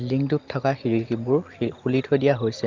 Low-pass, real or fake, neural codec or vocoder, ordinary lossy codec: 7.2 kHz; real; none; Opus, 24 kbps